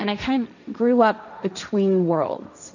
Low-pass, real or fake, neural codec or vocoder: 7.2 kHz; fake; codec, 16 kHz, 1.1 kbps, Voila-Tokenizer